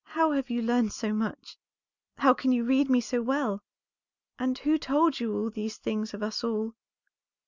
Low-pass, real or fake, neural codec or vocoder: 7.2 kHz; real; none